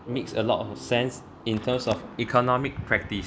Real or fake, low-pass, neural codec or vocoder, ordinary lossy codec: real; none; none; none